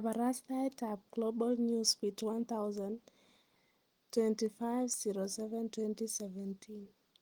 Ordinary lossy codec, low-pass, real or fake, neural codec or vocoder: Opus, 24 kbps; 19.8 kHz; real; none